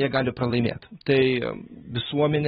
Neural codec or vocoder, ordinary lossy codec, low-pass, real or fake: none; AAC, 16 kbps; 9.9 kHz; real